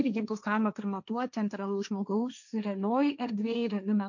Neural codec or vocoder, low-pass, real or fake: codec, 16 kHz, 1.1 kbps, Voila-Tokenizer; 7.2 kHz; fake